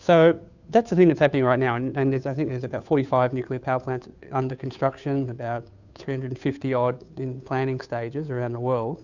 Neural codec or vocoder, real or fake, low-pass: codec, 16 kHz, 2 kbps, FunCodec, trained on Chinese and English, 25 frames a second; fake; 7.2 kHz